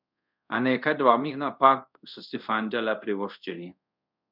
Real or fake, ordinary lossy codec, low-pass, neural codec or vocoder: fake; none; 5.4 kHz; codec, 24 kHz, 0.5 kbps, DualCodec